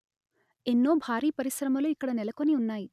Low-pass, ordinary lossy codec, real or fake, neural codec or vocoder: 14.4 kHz; none; real; none